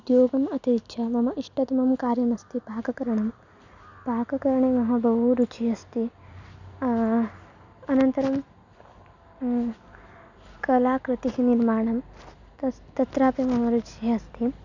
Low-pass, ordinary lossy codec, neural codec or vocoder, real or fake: 7.2 kHz; none; none; real